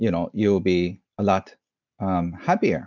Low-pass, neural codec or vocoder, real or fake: 7.2 kHz; none; real